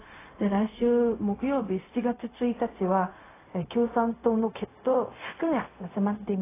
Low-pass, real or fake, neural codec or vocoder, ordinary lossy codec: 3.6 kHz; fake; codec, 16 kHz, 0.4 kbps, LongCat-Audio-Codec; AAC, 16 kbps